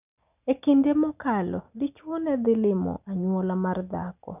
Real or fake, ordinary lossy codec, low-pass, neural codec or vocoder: real; none; 3.6 kHz; none